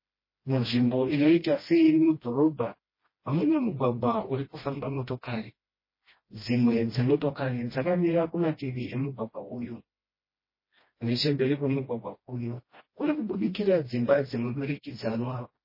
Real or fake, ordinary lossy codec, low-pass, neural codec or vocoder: fake; MP3, 24 kbps; 5.4 kHz; codec, 16 kHz, 1 kbps, FreqCodec, smaller model